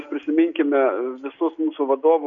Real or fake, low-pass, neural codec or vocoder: real; 7.2 kHz; none